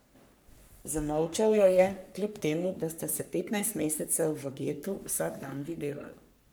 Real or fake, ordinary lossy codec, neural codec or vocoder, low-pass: fake; none; codec, 44.1 kHz, 3.4 kbps, Pupu-Codec; none